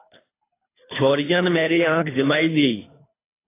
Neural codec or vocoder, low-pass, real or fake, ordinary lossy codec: codec, 24 kHz, 3 kbps, HILCodec; 3.6 kHz; fake; AAC, 16 kbps